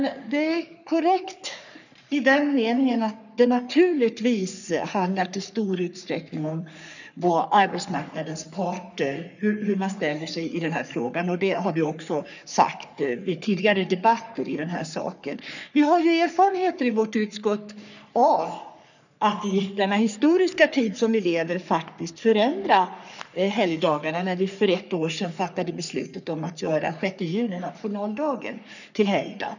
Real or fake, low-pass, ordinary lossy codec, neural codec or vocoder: fake; 7.2 kHz; none; codec, 44.1 kHz, 3.4 kbps, Pupu-Codec